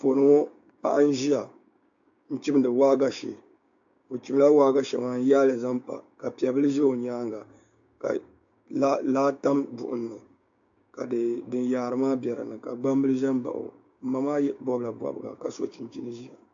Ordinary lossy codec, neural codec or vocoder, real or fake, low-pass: AAC, 64 kbps; codec, 16 kHz, 6 kbps, DAC; fake; 7.2 kHz